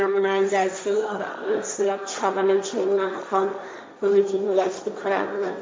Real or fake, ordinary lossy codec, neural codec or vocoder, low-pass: fake; none; codec, 16 kHz, 1.1 kbps, Voila-Tokenizer; none